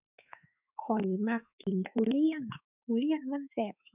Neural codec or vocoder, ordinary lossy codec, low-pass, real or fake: autoencoder, 48 kHz, 32 numbers a frame, DAC-VAE, trained on Japanese speech; none; 3.6 kHz; fake